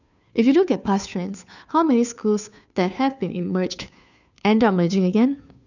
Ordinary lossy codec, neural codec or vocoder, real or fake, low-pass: none; codec, 16 kHz, 2 kbps, FunCodec, trained on Chinese and English, 25 frames a second; fake; 7.2 kHz